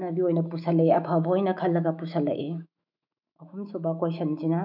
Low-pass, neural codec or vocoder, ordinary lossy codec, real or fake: 5.4 kHz; autoencoder, 48 kHz, 128 numbers a frame, DAC-VAE, trained on Japanese speech; none; fake